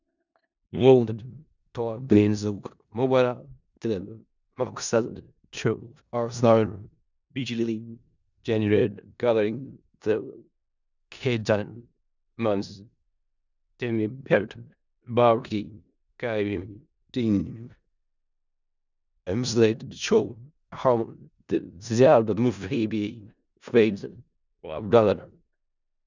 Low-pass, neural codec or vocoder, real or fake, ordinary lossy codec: 7.2 kHz; codec, 16 kHz in and 24 kHz out, 0.4 kbps, LongCat-Audio-Codec, four codebook decoder; fake; none